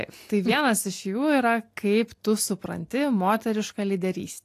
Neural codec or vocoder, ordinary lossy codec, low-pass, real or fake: none; AAC, 64 kbps; 14.4 kHz; real